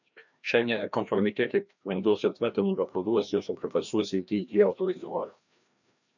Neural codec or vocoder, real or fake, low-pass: codec, 16 kHz, 1 kbps, FreqCodec, larger model; fake; 7.2 kHz